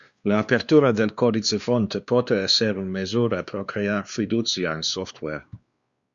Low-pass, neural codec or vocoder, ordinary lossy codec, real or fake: 7.2 kHz; codec, 16 kHz, 2 kbps, X-Codec, WavLM features, trained on Multilingual LibriSpeech; Opus, 64 kbps; fake